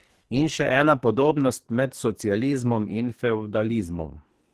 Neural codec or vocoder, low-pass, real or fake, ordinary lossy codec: codec, 44.1 kHz, 2.6 kbps, SNAC; 14.4 kHz; fake; Opus, 16 kbps